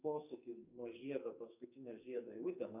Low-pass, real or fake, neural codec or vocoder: 3.6 kHz; fake; codec, 44.1 kHz, 2.6 kbps, SNAC